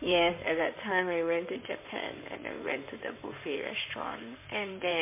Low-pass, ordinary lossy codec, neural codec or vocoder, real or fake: 3.6 kHz; MP3, 24 kbps; codec, 16 kHz in and 24 kHz out, 2.2 kbps, FireRedTTS-2 codec; fake